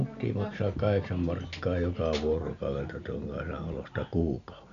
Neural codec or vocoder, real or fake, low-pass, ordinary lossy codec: none; real; 7.2 kHz; none